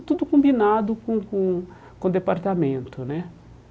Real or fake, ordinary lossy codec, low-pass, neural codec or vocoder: real; none; none; none